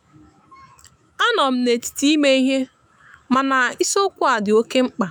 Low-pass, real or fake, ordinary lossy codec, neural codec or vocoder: none; fake; none; autoencoder, 48 kHz, 128 numbers a frame, DAC-VAE, trained on Japanese speech